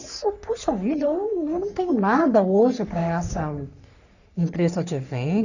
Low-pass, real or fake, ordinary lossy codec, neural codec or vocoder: 7.2 kHz; fake; none; codec, 44.1 kHz, 3.4 kbps, Pupu-Codec